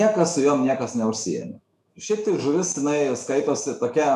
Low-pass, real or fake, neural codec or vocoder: 14.4 kHz; fake; autoencoder, 48 kHz, 128 numbers a frame, DAC-VAE, trained on Japanese speech